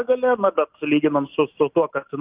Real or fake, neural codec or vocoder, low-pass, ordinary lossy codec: real; none; 3.6 kHz; Opus, 24 kbps